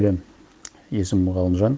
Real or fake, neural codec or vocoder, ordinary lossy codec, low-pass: real; none; none; none